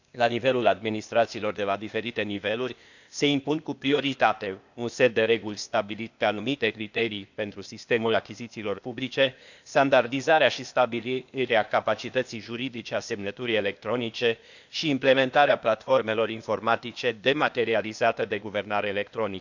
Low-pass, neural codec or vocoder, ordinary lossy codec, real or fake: 7.2 kHz; codec, 16 kHz, 0.8 kbps, ZipCodec; none; fake